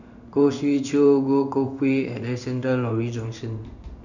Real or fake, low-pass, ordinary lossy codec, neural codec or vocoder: fake; 7.2 kHz; none; codec, 16 kHz in and 24 kHz out, 1 kbps, XY-Tokenizer